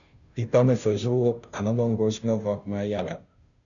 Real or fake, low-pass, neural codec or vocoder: fake; 7.2 kHz; codec, 16 kHz, 0.5 kbps, FunCodec, trained on Chinese and English, 25 frames a second